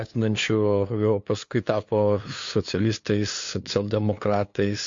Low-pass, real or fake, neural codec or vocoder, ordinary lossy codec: 7.2 kHz; fake; codec, 16 kHz, 2 kbps, FunCodec, trained on LibriTTS, 25 frames a second; MP3, 48 kbps